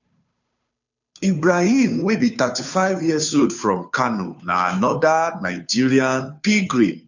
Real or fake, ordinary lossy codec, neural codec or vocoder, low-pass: fake; none; codec, 16 kHz, 2 kbps, FunCodec, trained on Chinese and English, 25 frames a second; 7.2 kHz